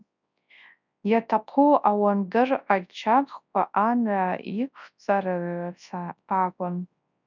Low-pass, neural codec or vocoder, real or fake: 7.2 kHz; codec, 24 kHz, 0.9 kbps, WavTokenizer, large speech release; fake